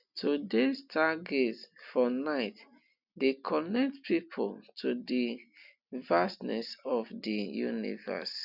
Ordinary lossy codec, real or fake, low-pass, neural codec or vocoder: none; real; 5.4 kHz; none